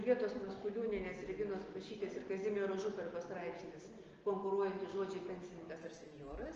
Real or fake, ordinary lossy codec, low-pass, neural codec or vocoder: real; Opus, 16 kbps; 7.2 kHz; none